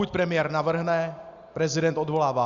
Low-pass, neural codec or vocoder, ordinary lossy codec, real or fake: 7.2 kHz; none; Opus, 64 kbps; real